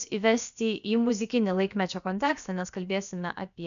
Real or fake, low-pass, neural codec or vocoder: fake; 7.2 kHz; codec, 16 kHz, about 1 kbps, DyCAST, with the encoder's durations